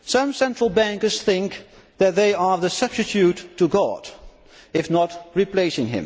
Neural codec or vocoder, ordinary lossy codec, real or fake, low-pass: none; none; real; none